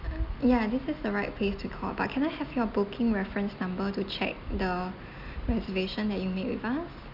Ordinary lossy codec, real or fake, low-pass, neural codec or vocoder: none; real; 5.4 kHz; none